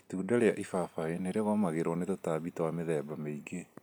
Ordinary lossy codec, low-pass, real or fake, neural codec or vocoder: none; none; real; none